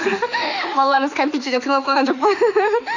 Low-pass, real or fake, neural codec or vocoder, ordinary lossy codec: 7.2 kHz; fake; autoencoder, 48 kHz, 32 numbers a frame, DAC-VAE, trained on Japanese speech; none